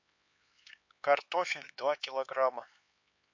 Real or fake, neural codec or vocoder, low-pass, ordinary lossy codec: fake; codec, 16 kHz, 4 kbps, X-Codec, HuBERT features, trained on LibriSpeech; 7.2 kHz; MP3, 48 kbps